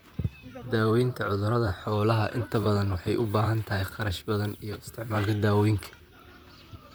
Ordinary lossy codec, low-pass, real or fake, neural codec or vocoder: none; none; fake; vocoder, 44.1 kHz, 128 mel bands, Pupu-Vocoder